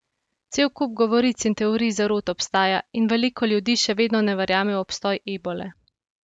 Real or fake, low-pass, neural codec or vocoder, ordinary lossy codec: real; none; none; none